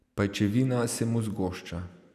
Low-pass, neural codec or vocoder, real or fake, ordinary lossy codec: 14.4 kHz; none; real; none